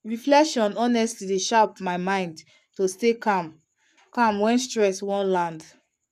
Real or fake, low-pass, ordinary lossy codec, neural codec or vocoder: fake; 14.4 kHz; none; codec, 44.1 kHz, 7.8 kbps, Pupu-Codec